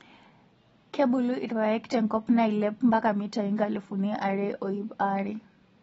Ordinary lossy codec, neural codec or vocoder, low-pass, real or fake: AAC, 24 kbps; none; 10.8 kHz; real